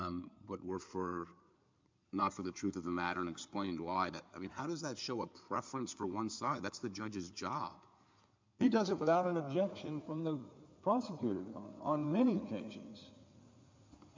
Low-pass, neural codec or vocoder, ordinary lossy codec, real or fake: 7.2 kHz; codec, 16 kHz in and 24 kHz out, 2.2 kbps, FireRedTTS-2 codec; MP3, 64 kbps; fake